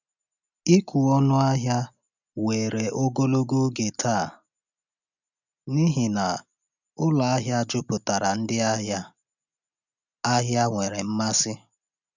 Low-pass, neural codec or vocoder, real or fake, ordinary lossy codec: 7.2 kHz; none; real; none